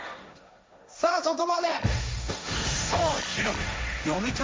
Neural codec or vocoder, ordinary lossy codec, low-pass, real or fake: codec, 16 kHz, 1.1 kbps, Voila-Tokenizer; none; none; fake